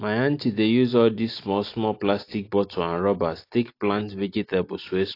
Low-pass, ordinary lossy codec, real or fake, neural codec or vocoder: 5.4 kHz; AAC, 32 kbps; real; none